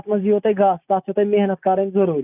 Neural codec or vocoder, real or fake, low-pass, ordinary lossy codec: none; real; 3.6 kHz; none